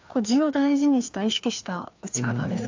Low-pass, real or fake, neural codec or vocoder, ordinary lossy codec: 7.2 kHz; fake; codec, 16 kHz, 2 kbps, FreqCodec, larger model; AAC, 48 kbps